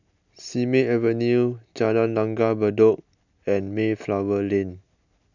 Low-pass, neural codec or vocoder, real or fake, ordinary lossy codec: 7.2 kHz; none; real; none